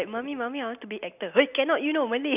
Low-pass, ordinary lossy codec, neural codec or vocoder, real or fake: 3.6 kHz; none; none; real